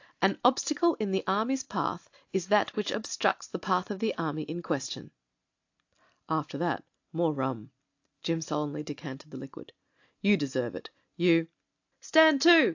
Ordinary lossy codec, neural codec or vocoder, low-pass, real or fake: AAC, 48 kbps; none; 7.2 kHz; real